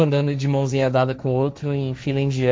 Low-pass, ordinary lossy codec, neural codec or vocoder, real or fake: none; none; codec, 16 kHz, 1.1 kbps, Voila-Tokenizer; fake